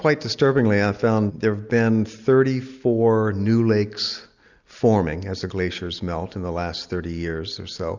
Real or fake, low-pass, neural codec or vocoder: real; 7.2 kHz; none